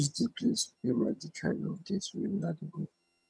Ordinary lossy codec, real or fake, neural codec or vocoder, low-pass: none; fake; vocoder, 22.05 kHz, 80 mel bands, HiFi-GAN; none